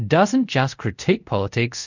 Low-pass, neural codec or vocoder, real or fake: 7.2 kHz; codec, 24 kHz, 0.5 kbps, DualCodec; fake